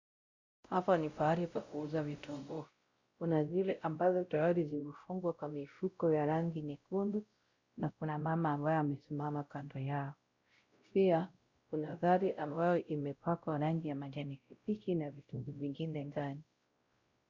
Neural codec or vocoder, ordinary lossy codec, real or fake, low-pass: codec, 16 kHz, 0.5 kbps, X-Codec, WavLM features, trained on Multilingual LibriSpeech; Opus, 64 kbps; fake; 7.2 kHz